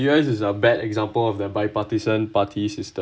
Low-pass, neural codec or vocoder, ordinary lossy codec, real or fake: none; none; none; real